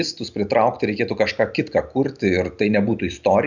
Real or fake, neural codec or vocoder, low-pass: real; none; 7.2 kHz